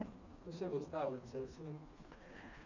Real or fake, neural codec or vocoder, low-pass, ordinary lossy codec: fake; codec, 16 kHz, 2 kbps, FreqCodec, smaller model; 7.2 kHz; none